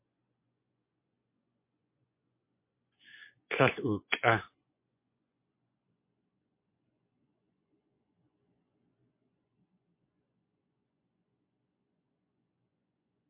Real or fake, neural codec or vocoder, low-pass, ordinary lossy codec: fake; vocoder, 24 kHz, 100 mel bands, Vocos; 3.6 kHz; MP3, 24 kbps